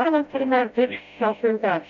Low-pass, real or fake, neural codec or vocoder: 7.2 kHz; fake; codec, 16 kHz, 0.5 kbps, FreqCodec, smaller model